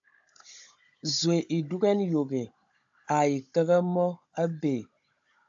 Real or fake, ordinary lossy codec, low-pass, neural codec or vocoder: fake; AAC, 64 kbps; 7.2 kHz; codec, 16 kHz, 16 kbps, FunCodec, trained on Chinese and English, 50 frames a second